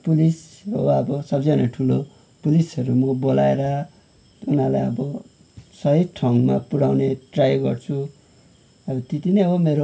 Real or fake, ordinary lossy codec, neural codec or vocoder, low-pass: real; none; none; none